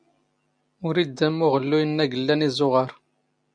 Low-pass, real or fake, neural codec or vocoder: 9.9 kHz; real; none